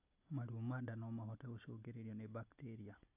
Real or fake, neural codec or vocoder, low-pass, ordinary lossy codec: real; none; 3.6 kHz; AAC, 32 kbps